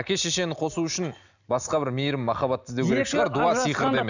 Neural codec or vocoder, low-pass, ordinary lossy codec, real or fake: none; 7.2 kHz; none; real